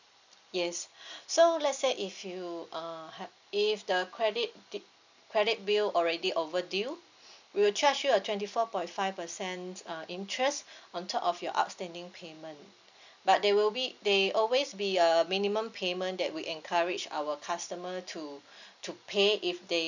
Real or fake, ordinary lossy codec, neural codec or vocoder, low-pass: real; none; none; 7.2 kHz